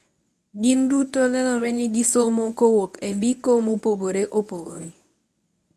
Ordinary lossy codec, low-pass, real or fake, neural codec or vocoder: none; none; fake; codec, 24 kHz, 0.9 kbps, WavTokenizer, medium speech release version 1